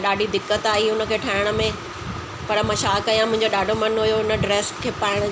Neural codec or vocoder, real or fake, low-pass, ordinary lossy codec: none; real; none; none